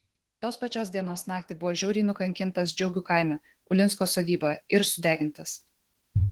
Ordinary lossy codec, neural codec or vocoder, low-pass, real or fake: Opus, 16 kbps; autoencoder, 48 kHz, 32 numbers a frame, DAC-VAE, trained on Japanese speech; 19.8 kHz; fake